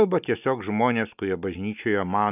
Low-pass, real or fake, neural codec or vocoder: 3.6 kHz; real; none